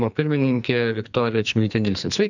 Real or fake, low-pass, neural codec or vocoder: fake; 7.2 kHz; codec, 44.1 kHz, 2.6 kbps, SNAC